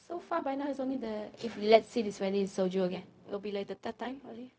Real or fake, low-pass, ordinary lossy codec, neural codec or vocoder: fake; none; none; codec, 16 kHz, 0.4 kbps, LongCat-Audio-Codec